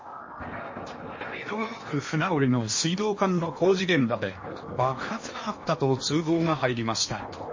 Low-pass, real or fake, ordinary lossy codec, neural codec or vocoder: 7.2 kHz; fake; MP3, 32 kbps; codec, 16 kHz in and 24 kHz out, 0.8 kbps, FocalCodec, streaming, 65536 codes